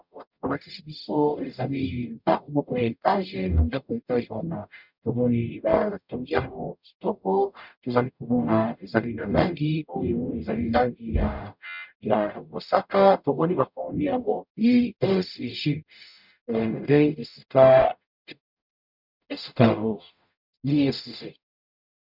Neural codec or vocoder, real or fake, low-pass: codec, 44.1 kHz, 0.9 kbps, DAC; fake; 5.4 kHz